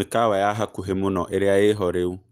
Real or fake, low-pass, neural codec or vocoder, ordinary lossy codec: real; 14.4 kHz; none; Opus, 24 kbps